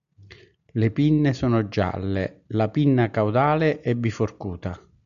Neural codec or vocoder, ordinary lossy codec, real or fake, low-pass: none; AAC, 96 kbps; real; 7.2 kHz